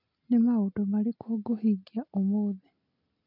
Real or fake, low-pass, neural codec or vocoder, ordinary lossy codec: real; 5.4 kHz; none; none